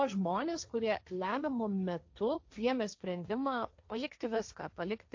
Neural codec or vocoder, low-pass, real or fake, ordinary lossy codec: codec, 16 kHz, 1.1 kbps, Voila-Tokenizer; 7.2 kHz; fake; AAC, 48 kbps